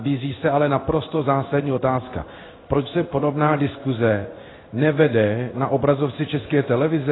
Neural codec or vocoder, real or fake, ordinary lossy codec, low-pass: codec, 16 kHz in and 24 kHz out, 1 kbps, XY-Tokenizer; fake; AAC, 16 kbps; 7.2 kHz